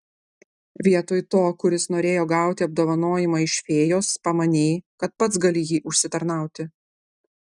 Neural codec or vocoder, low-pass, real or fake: none; 10.8 kHz; real